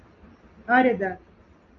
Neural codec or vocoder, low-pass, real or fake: none; 7.2 kHz; real